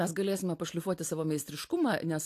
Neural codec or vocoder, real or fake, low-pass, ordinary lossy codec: none; real; 14.4 kHz; AAC, 64 kbps